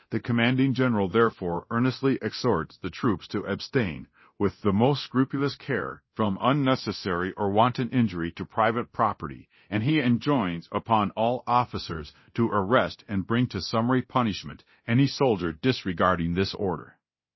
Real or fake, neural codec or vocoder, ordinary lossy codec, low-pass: fake; codec, 24 kHz, 0.9 kbps, DualCodec; MP3, 24 kbps; 7.2 kHz